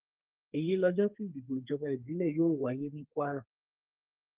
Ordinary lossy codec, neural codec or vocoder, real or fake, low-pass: Opus, 24 kbps; codec, 32 kHz, 1.9 kbps, SNAC; fake; 3.6 kHz